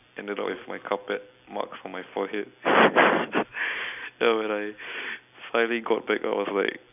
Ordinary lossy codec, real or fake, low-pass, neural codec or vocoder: none; real; 3.6 kHz; none